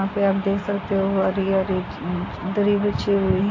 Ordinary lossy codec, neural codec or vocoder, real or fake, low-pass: AAC, 32 kbps; none; real; 7.2 kHz